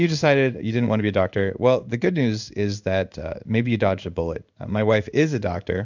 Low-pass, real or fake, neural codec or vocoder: 7.2 kHz; fake; codec, 16 kHz in and 24 kHz out, 1 kbps, XY-Tokenizer